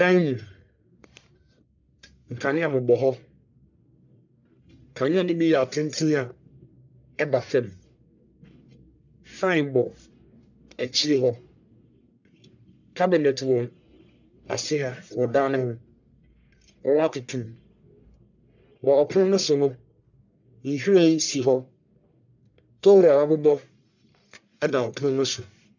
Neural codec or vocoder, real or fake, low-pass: codec, 44.1 kHz, 1.7 kbps, Pupu-Codec; fake; 7.2 kHz